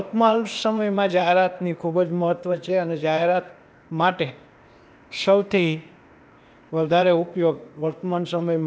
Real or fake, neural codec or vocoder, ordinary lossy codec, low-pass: fake; codec, 16 kHz, 0.8 kbps, ZipCodec; none; none